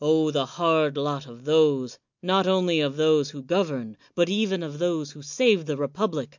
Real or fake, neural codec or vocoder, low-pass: real; none; 7.2 kHz